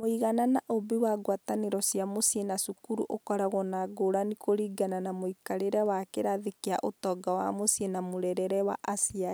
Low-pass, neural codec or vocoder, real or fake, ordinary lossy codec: none; none; real; none